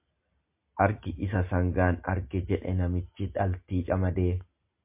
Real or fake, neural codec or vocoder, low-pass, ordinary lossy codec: real; none; 3.6 kHz; MP3, 32 kbps